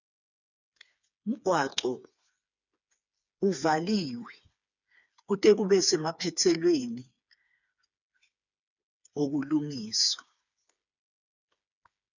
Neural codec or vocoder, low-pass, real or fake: codec, 16 kHz, 4 kbps, FreqCodec, smaller model; 7.2 kHz; fake